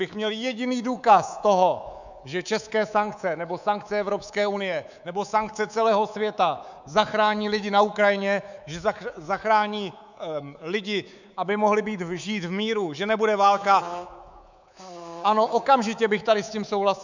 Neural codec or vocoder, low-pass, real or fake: codec, 24 kHz, 3.1 kbps, DualCodec; 7.2 kHz; fake